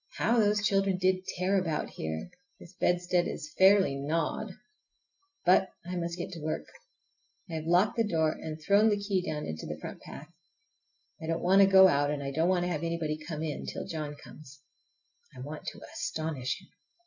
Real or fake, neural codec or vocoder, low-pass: real; none; 7.2 kHz